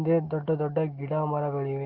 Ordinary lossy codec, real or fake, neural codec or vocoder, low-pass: Opus, 16 kbps; real; none; 5.4 kHz